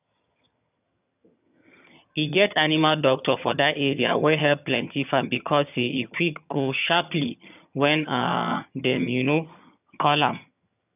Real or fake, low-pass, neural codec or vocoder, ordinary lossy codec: fake; 3.6 kHz; vocoder, 22.05 kHz, 80 mel bands, HiFi-GAN; none